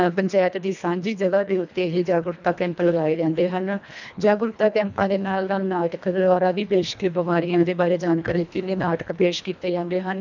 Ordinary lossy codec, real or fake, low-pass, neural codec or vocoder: none; fake; 7.2 kHz; codec, 24 kHz, 1.5 kbps, HILCodec